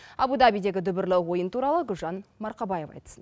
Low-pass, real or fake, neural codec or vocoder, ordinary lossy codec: none; real; none; none